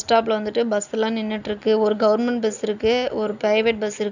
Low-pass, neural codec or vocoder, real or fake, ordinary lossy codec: 7.2 kHz; none; real; none